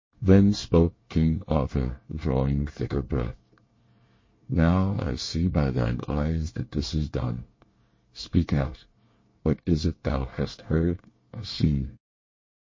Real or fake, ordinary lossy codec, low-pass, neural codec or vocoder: fake; MP3, 32 kbps; 7.2 kHz; codec, 24 kHz, 1 kbps, SNAC